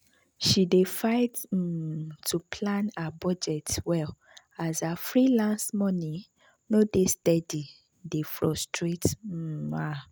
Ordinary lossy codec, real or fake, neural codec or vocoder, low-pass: none; real; none; none